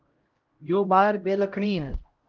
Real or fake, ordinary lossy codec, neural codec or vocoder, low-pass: fake; Opus, 16 kbps; codec, 16 kHz, 0.5 kbps, X-Codec, HuBERT features, trained on LibriSpeech; 7.2 kHz